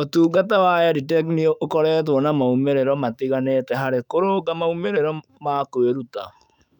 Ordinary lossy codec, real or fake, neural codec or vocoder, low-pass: none; fake; autoencoder, 48 kHz, 128 numbers a frame, DAC-VAE, trained on Japanese speech; 19.8 kHz